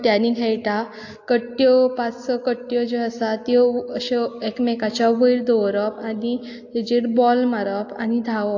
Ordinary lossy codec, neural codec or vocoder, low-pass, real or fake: AAC, 48 kbps; none; 7.2 kHz; real